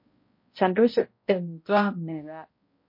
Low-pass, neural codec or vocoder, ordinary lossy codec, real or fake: 5.4 kHz; codec, 16 kHz, 0.5 kbps, X-Codec, HuBERT features, trained on balanced general audio; MP3, 32 kbps; fake